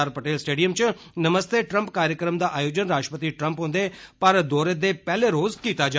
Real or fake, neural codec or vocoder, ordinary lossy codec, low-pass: real; none; none; none